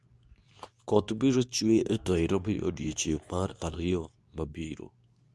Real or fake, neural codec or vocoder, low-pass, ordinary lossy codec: fake; codec, 24 kHz, 0.9 kbps, WavTokenizer, medium speech release version 2; none; none